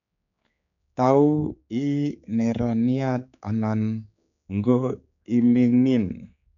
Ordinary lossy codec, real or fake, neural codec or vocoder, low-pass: none; fake; codec, 16 kHz, 4 kbps, X-Codec, HuBERT features, trained on general audio; 7.2 kHz